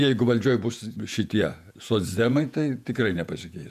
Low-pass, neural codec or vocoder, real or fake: 14.4 kHz; vocoder, 48 kHz, 128 mel bands, Vocos; fake